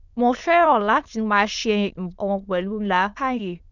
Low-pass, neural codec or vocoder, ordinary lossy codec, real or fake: 7.2 kHz; autoencoder, 22.05 kHz, a latent of 192 numbers a frame, VITS, trained on many speakers; none; fake